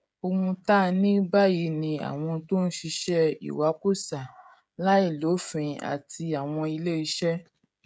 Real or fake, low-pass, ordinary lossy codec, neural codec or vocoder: fake; none; none; codec, 16 kHz, 16 kbps, FreqCodec, smaller model